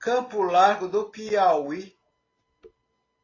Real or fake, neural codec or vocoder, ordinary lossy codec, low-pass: real; none; AAC, 32 kbps; 7.2 kHz